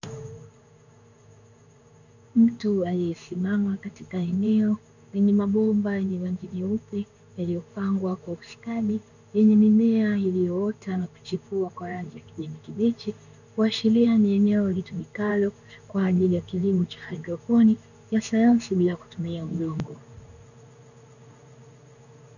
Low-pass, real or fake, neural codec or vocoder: 7.2 kHz; fake; codec, 16 kHz in and 24 kHz out, 1 kbps, XY-Tokenizer